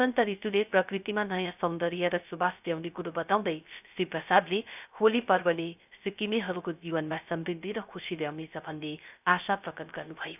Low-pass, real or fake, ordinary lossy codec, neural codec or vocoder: 3.6 kHz; fake; none; codec, 16 kHz, 0.3 kbps, FocalCodec